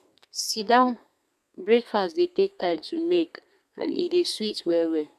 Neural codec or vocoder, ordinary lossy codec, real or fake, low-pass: codec, 32 kHz, 1.9 kbps, SNAC; none; fake; 14.4 kHz